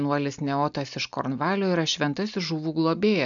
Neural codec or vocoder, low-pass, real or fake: none; 7.2 kHz; real